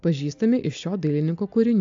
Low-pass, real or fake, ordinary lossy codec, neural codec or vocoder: 7.2 kHz; real; AAC, 64 kbps; none